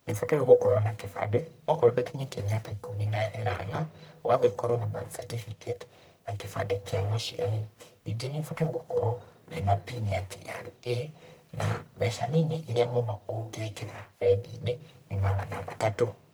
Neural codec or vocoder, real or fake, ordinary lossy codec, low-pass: codec, 44.1 kHz, 1.7 kbps, Pupu-Codec; fake; none; none